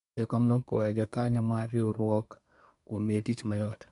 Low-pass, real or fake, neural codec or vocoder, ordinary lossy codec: 10.8 kHz; fake; codec, 24 kHz, 1 kbps, SNAC; none